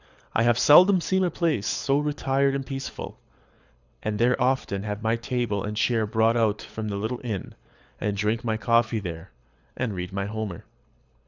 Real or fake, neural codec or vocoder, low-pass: fake; codec, 24 kHz, 6 kbps, HILCodec; 7.2 kHz